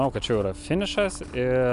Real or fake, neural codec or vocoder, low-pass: real; none; 10.8 kHz